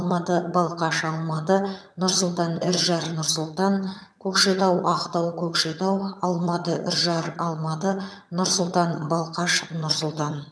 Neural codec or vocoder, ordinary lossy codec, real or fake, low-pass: vocoder, 22.05 kHz, 80 mel bands, HiFi-GAN; none; fake; none